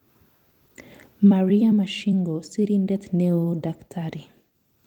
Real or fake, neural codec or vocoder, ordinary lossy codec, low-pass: fake; vocoder, 44.1 kHz, 128 mel bands every 256 samples, BigVGAN v2; Opus, 24 kbps; 19.8 kHz